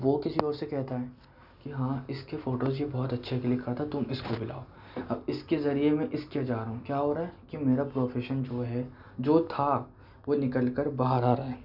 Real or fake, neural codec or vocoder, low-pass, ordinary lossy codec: real; none; 5.4 kHz; none